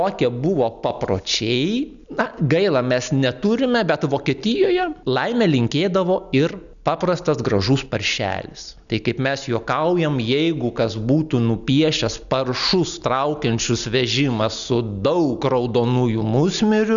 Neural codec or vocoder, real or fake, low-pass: none; real; 7.2 kHz